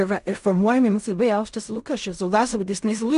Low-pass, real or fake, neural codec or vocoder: 10.8 kHz; fake; codec, 16 kHz in and 24 kHz out, 0.4 kbps, LongCat-Audio-Codec, fine tuned four codebook decoder